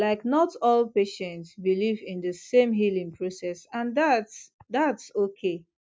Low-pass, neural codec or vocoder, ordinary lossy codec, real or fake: none; none; none; real